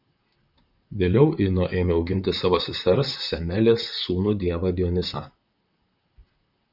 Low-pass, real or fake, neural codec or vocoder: 5.4 kHz; fake; vocoder, 44.1 kHz, 128 mel bands, Pupu-Vocoder